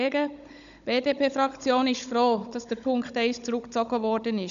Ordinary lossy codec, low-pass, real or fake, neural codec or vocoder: none; 7.2 kHz; fake; codec, 16 kHz, 16 kbps, FunCodec, trained on Chinese and English, 50 frames a second